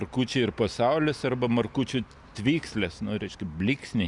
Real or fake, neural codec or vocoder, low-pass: real; none; 10.8 kHz